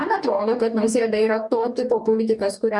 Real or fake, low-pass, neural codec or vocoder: fake; 10.8 kHz; codec, 44.1 kHz, 2.6 kbps, DAC